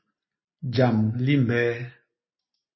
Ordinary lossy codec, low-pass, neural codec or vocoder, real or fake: MP3, 24 kbps; 7.2 kHz; none; real